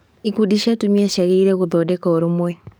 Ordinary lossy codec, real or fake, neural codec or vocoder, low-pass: none; fake; codec, 44.1 kHz, 7.8 kbps, DAC; none